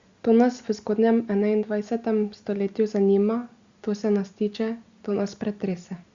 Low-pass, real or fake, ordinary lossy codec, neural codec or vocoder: 7.2 kHz; real; Opus, 64 kbps; none